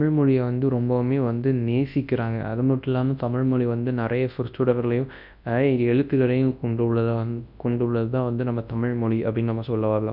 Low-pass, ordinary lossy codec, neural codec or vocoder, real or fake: 5.4 kHz; none; codec, 24 kHz, 0.9 kbps, WavTokenizer, large speech release; fake